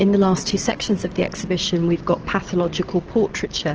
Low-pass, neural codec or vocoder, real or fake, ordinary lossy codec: 7.2 kHz; none; real; Opus, 16 kbps